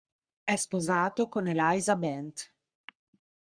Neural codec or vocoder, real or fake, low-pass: codec, 44.1 kHz, 3.4 kbps, Pupu-Codec; fake; 9.9 kHz